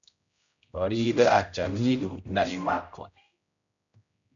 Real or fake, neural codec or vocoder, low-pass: fake; codec, 16 kHz, 0.5 kbps, X-Codec, HuBERT features, trained on general audio; 7.2 kHz